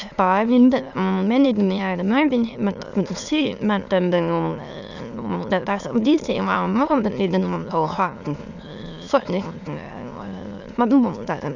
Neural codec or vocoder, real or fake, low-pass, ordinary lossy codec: autoencoder, 22.05 kHz, a latent of 192 numbers a frame, VITS, trained on many speakers; fake; 7.2 kHz; none